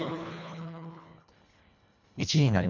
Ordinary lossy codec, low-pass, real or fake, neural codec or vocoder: none; 7.2 kHz; fake; codec, 24 kHz, 1.5 kbps, HILCodec